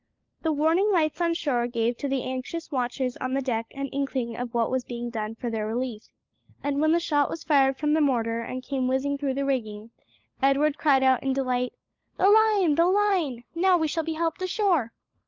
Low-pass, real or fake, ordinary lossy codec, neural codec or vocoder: 7.2 kHz; fake; Opus, 24 kbps; codec, 16 kHz, 16 kbps, FunCodec, trained on LibriTTS, 50 frames a second